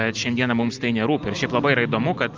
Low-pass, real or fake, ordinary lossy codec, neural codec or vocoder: 7.2 kHz; real; Opus, 24 kbps; none